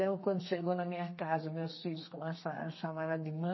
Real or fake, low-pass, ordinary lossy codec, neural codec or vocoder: fake; 7.2 kHz; MP3, 24 kbps; codec, 32 kHz, 1.9 kbps, SNAC